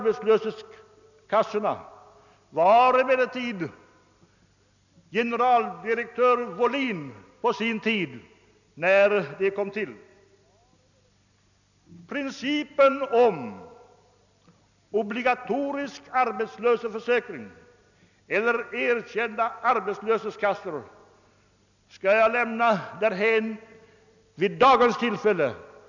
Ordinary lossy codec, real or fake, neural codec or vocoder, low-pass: none; real; none; 7.2 kHz